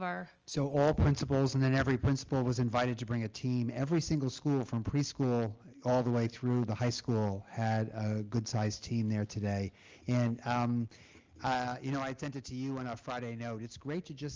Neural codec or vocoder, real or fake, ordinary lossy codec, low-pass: none; real; Opus, 24 kbps; 7.2 kHz